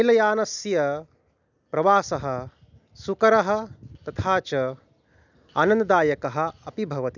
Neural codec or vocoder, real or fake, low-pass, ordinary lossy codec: none; real; 7.2 kHz; none